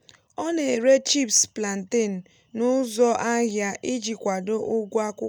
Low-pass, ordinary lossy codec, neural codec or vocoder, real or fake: none; none; none; real